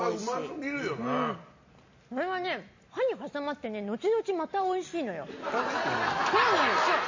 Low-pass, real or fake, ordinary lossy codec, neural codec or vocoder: 7.2 kHz; real; MP3, 32 kbps; none